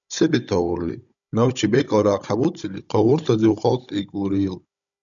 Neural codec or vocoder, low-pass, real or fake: codec, 16 kHz, 16 kbps, FunCodec, trained on Chinese and English, 50 frames a second; 7.2 kHz; fake